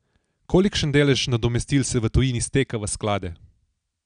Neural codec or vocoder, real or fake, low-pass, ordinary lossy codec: none; real; 9.9 kHz; none